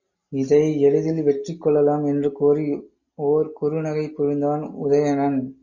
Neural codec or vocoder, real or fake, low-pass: none; real; 7.2 kHz